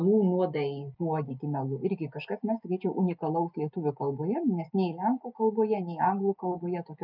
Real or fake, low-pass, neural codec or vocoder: real; 5.4 kHz; none